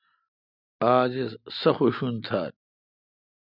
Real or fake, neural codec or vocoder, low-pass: real; none; 5.4 kHz